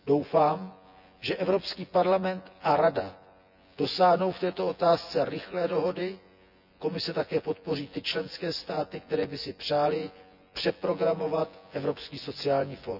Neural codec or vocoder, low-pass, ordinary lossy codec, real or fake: vocoder, 24 kHz, 100 mel bands, Vocos; 5.4 kHz; none; fake